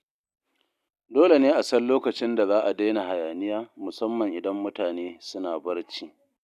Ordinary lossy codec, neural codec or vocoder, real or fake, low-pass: none; none; real; 14.4 kHz